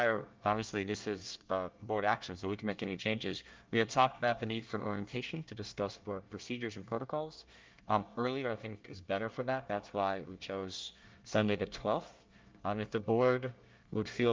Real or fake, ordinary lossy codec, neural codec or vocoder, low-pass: fake; Opus, 24 kbps; codec, 24 kHz, 1 kbps, SNAC; 7.2 kHz